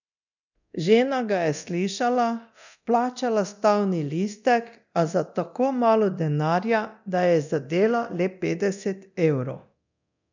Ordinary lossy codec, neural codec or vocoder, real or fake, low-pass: none; codec, 24 kHz, 0.9 kbps, DualCodec; fake; 7.2 kHz